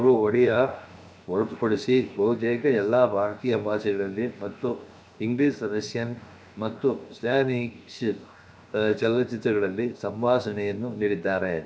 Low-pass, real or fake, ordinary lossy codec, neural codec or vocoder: none; fake; none; codec, 16 kHz, 0.7 kbps, FocalCodec